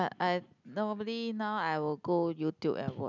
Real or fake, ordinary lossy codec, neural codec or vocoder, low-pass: real; none; none; 7.2 kHz